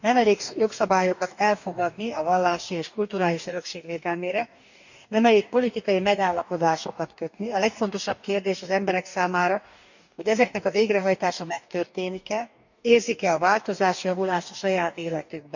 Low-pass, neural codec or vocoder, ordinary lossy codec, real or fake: 7.2 kHz; codec, 44.1 kHz, 2.6 kbps, DAC; none; fake